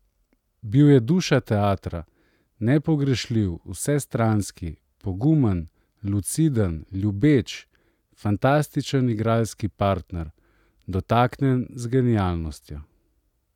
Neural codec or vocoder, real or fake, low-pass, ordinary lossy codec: none; real; 19.8 kHz; none